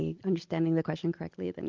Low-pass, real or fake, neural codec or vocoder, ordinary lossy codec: 7.2 kHz; real; none; Opus, 32 kbps